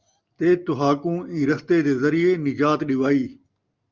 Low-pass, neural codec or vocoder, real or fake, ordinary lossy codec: 7.2 kHz; none; real; Opus, 24 kbps